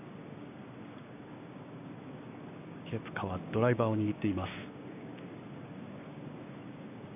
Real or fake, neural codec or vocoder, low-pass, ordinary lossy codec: fake; codec, 16 kHz, 6 kbps, DAC; 3.6 kHz; none